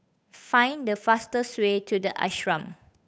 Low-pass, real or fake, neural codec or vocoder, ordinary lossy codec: none; fake; codec, 16 kHz, 8 kbps, FunCodec, trained on Chinese and English, 25 frames a second; none